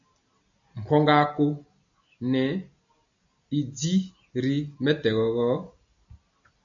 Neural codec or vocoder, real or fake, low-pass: none; real; 7.2 kHz